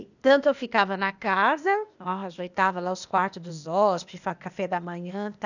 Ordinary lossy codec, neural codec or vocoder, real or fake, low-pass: none; codec, 16 kHz, 0.8 kbps, ZipCodec; fake; 7.2 kHz